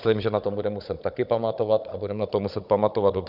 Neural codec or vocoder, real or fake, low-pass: codec, 16 kHz, 16 kbps, FunCodec, trained on Chinese and English, 50 frames a second; fake; 5.4 kHz